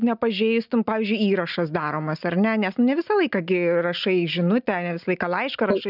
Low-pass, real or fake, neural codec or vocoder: 5.4 kHz; real; none